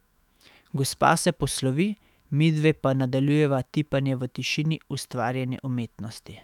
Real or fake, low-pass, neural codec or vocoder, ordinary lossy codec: fake; 19.8 kHz; autoencoder, 48 kHz, 128 numbers a frame, DAC-VAE, trained on Japanese speech; none